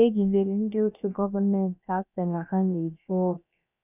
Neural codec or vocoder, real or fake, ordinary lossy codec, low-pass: codec, 16 kHz, about 1 kbps, DyCAST, with the encoder's durations; fake; none; 3.6 kHz